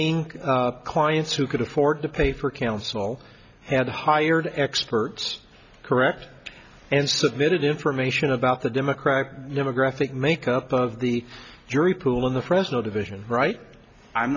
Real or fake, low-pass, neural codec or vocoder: real; 7.2 kHz; none